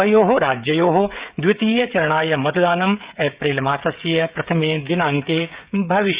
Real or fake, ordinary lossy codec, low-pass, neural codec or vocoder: fake; Opus, 64 kbps; 3.6 kHz; codec, 16 kHz, 16 kbps, FreqCodec, smaller model